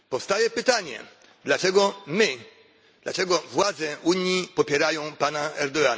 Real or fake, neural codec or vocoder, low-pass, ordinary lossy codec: real; none; none; none